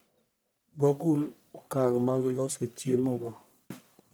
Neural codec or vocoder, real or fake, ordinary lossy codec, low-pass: codec, 44.1 kHz, 1.7 kbps, Pupu-Codec; fake; none; none